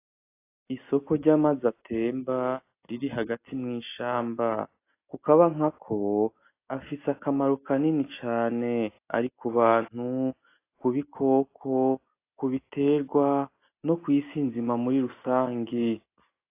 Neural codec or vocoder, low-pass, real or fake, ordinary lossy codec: none; 3.6 kHz; real; AAC, 24 kbps